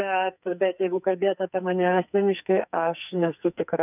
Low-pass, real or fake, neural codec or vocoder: 3.6 kHz; fake; codec, 44.1 kHz, 2.6 kbps, SNAC